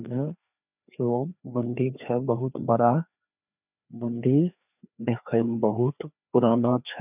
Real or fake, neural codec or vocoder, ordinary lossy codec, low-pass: fake; codec, 16 kHz, 2 kbps, FreqCodec, larger model; none; 3.6 kHz